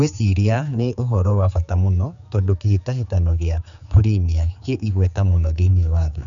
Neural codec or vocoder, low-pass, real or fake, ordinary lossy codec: codec, 16 kHz, 4 kbps, X-Codec, HuBERT features, trained on general audio; 7.2 kHz; fake; none